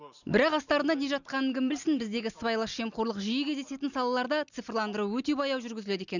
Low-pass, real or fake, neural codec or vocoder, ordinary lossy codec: 7.2 kHz; real; none; none